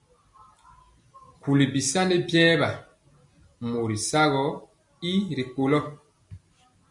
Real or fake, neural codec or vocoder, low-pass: real; none; 10.8 kHz